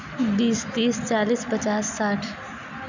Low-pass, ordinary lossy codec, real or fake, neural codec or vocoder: 7.2 kHz; none; real; none